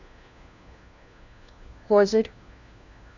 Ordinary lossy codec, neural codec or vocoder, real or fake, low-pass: none; codec, 16 kHz, 1 kbps, FunCodec, trained on LibriTTS, 50 frames a second; fake; 7.2 kHz